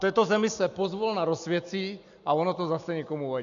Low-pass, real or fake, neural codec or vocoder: 7.2 kHz; real; none